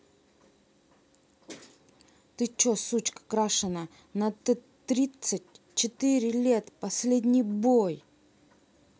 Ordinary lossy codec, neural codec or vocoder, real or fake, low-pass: none; none; real; none